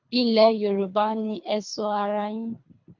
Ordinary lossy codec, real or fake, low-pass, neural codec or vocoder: MP3, 48 kbps; fake; 7.2 kHz; codec, 24 kHz, 3 kbps, HILCodec